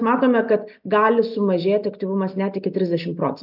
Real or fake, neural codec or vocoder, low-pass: real; none; 5.4 kHz